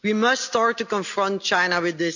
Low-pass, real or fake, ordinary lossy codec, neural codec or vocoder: 7.2 kHz; real; none; none